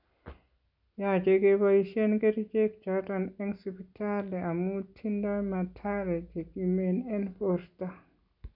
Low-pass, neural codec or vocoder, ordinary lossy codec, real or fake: 5.4 kHz; none; none; real